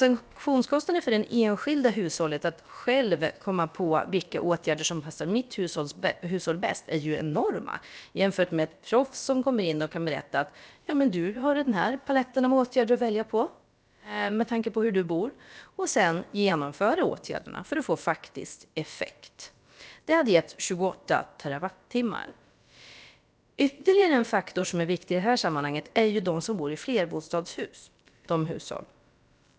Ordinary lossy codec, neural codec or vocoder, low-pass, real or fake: none; codec, 16 kHz, about 1 kbps, DyCAST, with the encoder's durations; none; fake